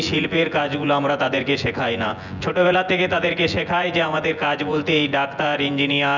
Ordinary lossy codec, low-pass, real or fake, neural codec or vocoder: none; 7.2 kHz; fake; vocoder, 24 kHz, 100 mel bands, Vocos